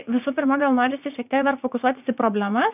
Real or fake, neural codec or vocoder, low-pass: fake; vocoder, 44.1 kHz, 80 mel bands, Vocos; 3.6 kHz